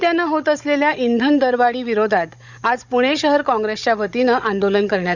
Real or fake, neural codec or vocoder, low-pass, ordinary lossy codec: fake; codec, 16 kHz, 16 kbps, FunCodec, trained on Chinese and English, 50 frames a second; 7.2 kHz; none